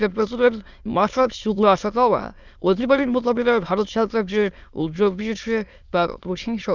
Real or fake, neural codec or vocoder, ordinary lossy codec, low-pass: fake; autoencoder, 22.05 kHz, a latent of 192 numbers a frame, VITS, trained on many speakers; none; 7.2 kHz